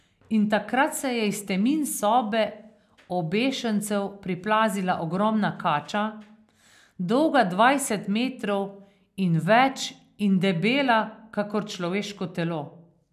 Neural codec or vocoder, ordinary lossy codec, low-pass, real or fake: none; none; 14.4 kHz; real